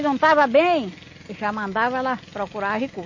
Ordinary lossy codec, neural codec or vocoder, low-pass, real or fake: MP3, 32 kbps; none; 7.2 kHz; real